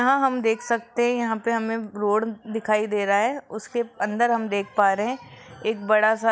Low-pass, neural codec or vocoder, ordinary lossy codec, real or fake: none; none; none; real